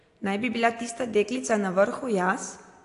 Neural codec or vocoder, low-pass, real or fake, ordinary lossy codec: none; 10.8 kHz; real; AAC, 48 kbps